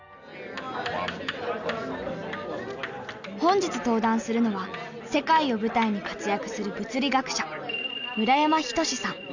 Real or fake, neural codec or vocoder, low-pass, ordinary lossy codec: real; none; 7.2 kHz; none